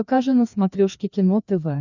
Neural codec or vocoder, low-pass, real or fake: codec, 16 kHz, 2 kbps, FreqCodec, larger model; 7.2 kHz; fake